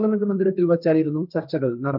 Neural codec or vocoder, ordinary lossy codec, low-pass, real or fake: codec, 16 kHz, 1.1 kbps, Voila-Tokenizer; none; 5.4 kHz; fake